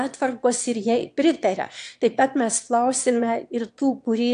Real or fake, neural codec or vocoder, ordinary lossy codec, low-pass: fake; autoencoder, 22.05 kHz, a latent of 192 numbers a frame, VITS, trained on one speaker; AAC, 64 kbps; 9.9 kHz